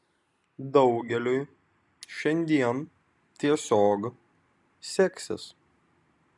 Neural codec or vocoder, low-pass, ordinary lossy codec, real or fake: none; 10.8 kHz; MP3, 96 kbps; real